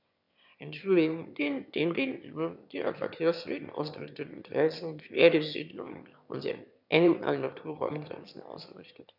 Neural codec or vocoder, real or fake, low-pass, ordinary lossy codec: autoencoder, 22.05 kHz, a latent of 192 numbers a frame, VITS, trained on one speaker; fake; 5.4 kHz; none